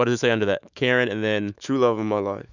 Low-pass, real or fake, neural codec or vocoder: 7.2 kHz; fake; autoencoder, 48 kHz, 128 numbers a frame, DAC-VAE, trained on Japanese speech